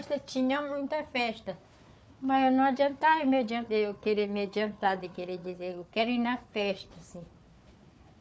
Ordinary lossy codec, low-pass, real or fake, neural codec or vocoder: none; none; fake; codec, 16 kHz, 4 kbps, FunCodec, trained on Chinese and English, 50 frames a second